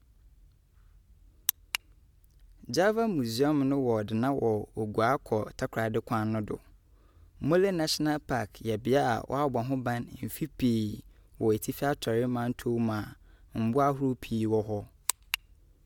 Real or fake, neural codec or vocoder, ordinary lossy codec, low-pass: real; none; MP3, 96 kbps; 19.8 kHz